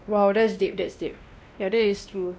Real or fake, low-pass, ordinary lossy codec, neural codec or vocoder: fake; none; none; codec, 16 kHz, 1 kbps, X-Codec, WavLM features, trained on Multilingual LibriSpeech